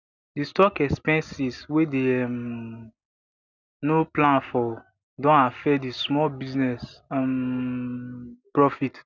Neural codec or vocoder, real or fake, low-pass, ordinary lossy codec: none; real; 7.2 kHz; none